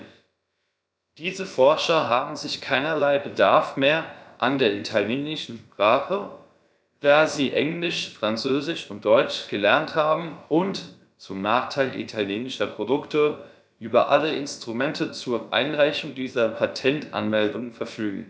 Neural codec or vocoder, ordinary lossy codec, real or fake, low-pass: codec, 16 kHz, about 1 kbps, DyCAST, with the encoder's durations; none; fake; none